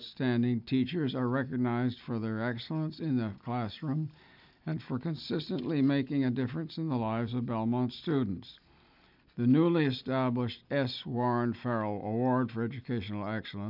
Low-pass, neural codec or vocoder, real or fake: 5.4 kHz; none; real